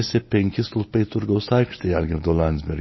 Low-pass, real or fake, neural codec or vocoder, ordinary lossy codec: 7.2 kHz; real; none; MP3, 24 kbps